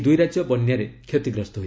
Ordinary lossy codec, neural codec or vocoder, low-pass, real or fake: none; none; none; real